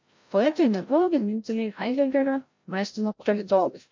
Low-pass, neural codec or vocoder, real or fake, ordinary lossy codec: 7.2 kHz; codec, 16 kHz, 0.5 kbps, FreqCodec, larger model; fake; MP3, 48 kbps